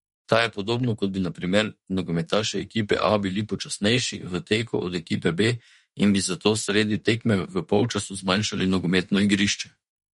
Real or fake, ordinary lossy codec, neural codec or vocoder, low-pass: fake; MP3, 48 kbps; autoencoder, 48 kHz, 32 numbers a frame, DAC-VAE, trained on Japanese speech; 19.8 kHz